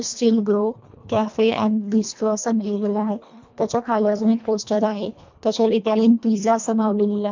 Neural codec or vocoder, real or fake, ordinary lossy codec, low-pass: codec, 24 kHz, 1.5 kbps, HILCodec; fake; MP3, 64 kbps; 7.2 kHz